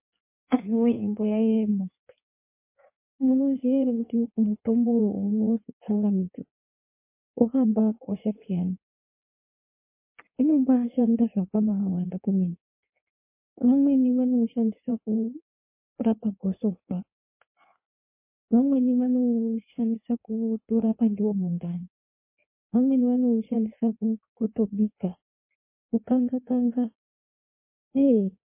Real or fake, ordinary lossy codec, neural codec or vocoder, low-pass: fake; MP3, 24 kbps; codec, 16 kHz in and 24 kHz out, 1.1 kbps, FireRedTTS-2 codec; 3.6 kHz